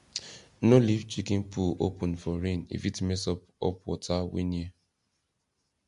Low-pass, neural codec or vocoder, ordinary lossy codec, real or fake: 10.8 kHz; none; MP3, 64 kbps; real